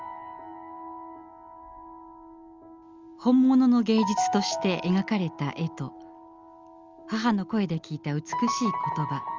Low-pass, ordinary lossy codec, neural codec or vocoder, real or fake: 7.2 kHz; Opus, 32 kbps; none; real